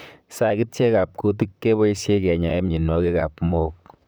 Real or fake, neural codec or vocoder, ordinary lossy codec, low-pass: fake; vocoder, 44.1 kHz, 128 mel bands, Pupu-Vocoder; none; none